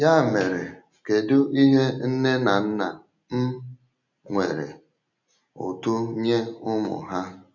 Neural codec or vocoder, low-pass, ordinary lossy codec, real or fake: none; 7.2 kHz; none; real